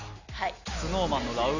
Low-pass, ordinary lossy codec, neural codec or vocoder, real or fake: 7.2 kHz; none; none; real